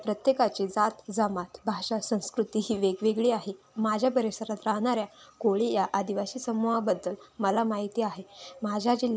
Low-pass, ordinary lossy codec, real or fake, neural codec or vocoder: none; none; real; none